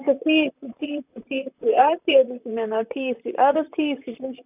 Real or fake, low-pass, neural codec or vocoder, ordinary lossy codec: fake; 3.6 kHz; vocoder, 44.1 kHz, 128 mel bands, Pupu-Vocoder; none